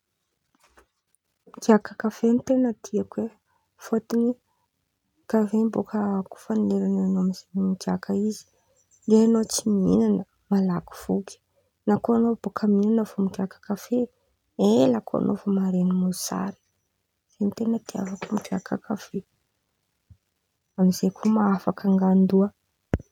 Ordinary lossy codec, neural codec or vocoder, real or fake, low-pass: none; none; real; 19.8 kHz